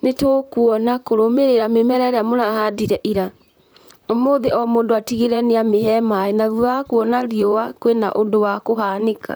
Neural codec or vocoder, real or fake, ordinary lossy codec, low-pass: vocoder, 44.1 kHz, 128 mel bands, Pupu-Vocoder; fake; none; none